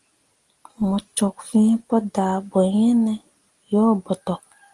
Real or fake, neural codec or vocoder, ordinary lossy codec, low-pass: real; none; Opus, 24 kbps; 10.8 kHz